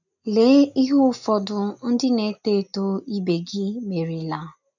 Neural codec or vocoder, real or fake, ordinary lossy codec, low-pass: none; real; none; 7.2 kHz